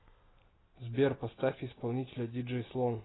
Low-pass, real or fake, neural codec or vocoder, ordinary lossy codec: 7.2 kHz; real; none; AAC, 16 kbps